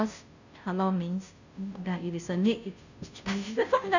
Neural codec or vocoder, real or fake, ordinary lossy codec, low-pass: codec, 16 kHz, 0.5 kbps, FunCodec, trained on Chinese and English, 25 frames a second; fake; none; 7.2 kHz